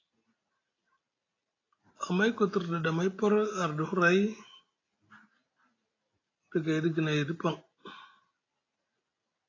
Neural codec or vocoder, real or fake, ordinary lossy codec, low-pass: none; real; AAC, 32 kbps; 7.2 kHz